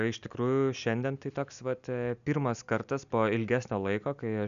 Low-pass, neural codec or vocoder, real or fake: 7.2 kHz; none; real